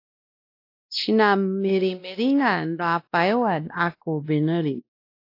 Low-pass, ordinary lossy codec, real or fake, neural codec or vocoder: 5.4 kHz; AAC, 32 kbps; fake; codec, 16 kHz, 1 kbps, X-Codec, WavLM features, trained on Multilingual LibriSpeech